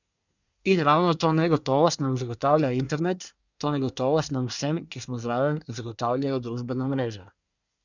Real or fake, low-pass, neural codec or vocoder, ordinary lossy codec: fake; 7.2 kHz; codec, 24 kHz, 1 kbps, SNAC; none